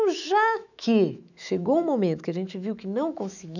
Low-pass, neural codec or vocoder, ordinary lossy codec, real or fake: 7.2 kHz; autoencoder, 48 kHz, 128 numbers a frame, DAC-VAE, trained on Japanese speech; none; fake